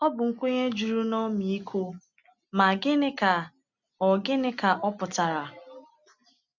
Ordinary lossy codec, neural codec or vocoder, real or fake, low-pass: none; none; real; 7.2 kHz